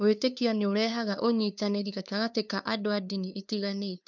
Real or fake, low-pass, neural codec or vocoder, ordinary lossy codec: fake; 7.2 kHz; codec, 16 kHz, 2 kbps, FunCodec, trained on LibriTTS, 25 frames a second; none